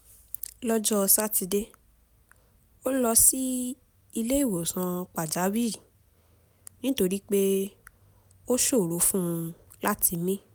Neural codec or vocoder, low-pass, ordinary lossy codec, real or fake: none; none; none; real